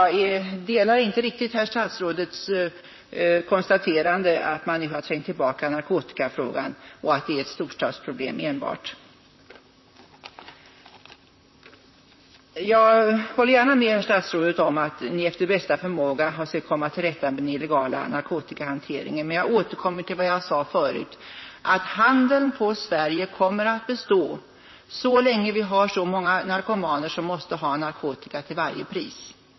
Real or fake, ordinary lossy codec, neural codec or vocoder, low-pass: fake; MP3, 24 kbps; vocoder, 44.1 kHz, 128 mel bands, Pupu-Vocoder; 7.2 kHz